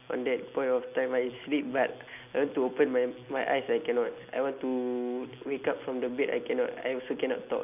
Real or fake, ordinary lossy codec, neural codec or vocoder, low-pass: real; none; none; 3.6 kHz